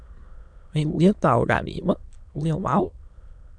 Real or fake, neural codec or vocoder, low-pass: fake; autoencoder, 22.05 kHz, a latent of 192 numbers a frame, VITS, trained on many speakers; 9.9 kHz